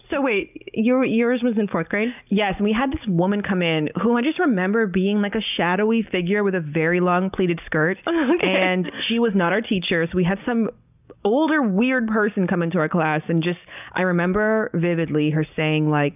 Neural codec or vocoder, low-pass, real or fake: none; 3.6 kHz; real